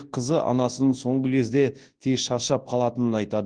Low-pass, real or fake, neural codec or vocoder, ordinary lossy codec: 9.9 kHz; fake; codec, 24 kHz, 0.9 kbps, WavTokenizer, large speech release; Opus, 16 kbps